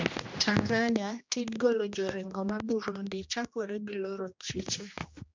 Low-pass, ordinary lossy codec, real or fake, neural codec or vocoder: 7.2 kHz; MP3, 64 kbps; fake; codec, 16 kHz, 1 kbps, X-Codec, HuBERT features, trained on general audio